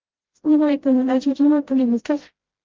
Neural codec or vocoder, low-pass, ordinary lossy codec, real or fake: codec, 16 kHz, 0.5 kbps, FreqCodec, smaller model; 7.2 kHz; Opus, 16 kbps; fake